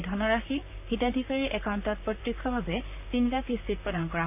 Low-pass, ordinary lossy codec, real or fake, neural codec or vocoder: 3.6 kHz; none; fake; vocoder, 44.1 kHz, 128 mel bands, Pupu-Vocoder